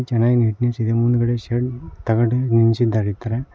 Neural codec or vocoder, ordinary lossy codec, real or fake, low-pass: none; none; real; none